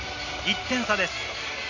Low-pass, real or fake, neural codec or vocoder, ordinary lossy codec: 7.2 kHz; real; none; none